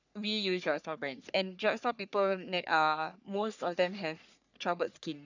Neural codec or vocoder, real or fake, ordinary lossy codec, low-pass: codec, 44.1 kHz, 3.4 kbps, Pupu-Codec; fake; none; 7.2 kHz